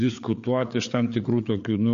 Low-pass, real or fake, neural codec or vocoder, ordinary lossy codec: 7.2 kHz; fake; codec, 16 kHz, 4 kbps, FreqCodec, larger model; MP3, 48 kbps